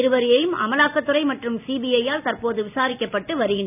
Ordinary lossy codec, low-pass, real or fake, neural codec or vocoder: none; 3.6 kHz; real; none